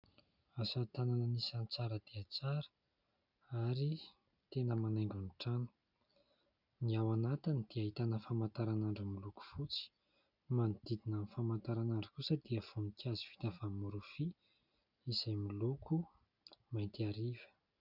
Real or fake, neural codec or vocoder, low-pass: real; none; 5.4 kHz